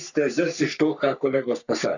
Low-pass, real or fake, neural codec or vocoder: 7.2 kHz; fake; codec, 44.1 kHz, 3.4 kbps, Pupu-Codec